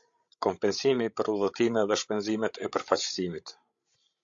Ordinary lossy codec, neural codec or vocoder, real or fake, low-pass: AAC, 64 kbps; codec, 16 kHz, 16 kbps, FreqCodec, larger model; fake; 7.2 kHz